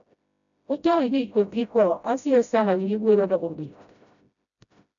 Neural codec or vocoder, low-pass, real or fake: codec, 16 kHz, 0.5 kbps, FreqCodec, smaller model; 7.2 kHz; fake